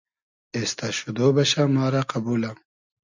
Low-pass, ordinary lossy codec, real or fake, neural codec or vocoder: 7.2 kHz; MP3, 64 kbps; real; none